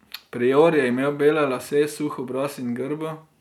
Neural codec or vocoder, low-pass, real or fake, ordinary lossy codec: none; 19.8 kHz; real; none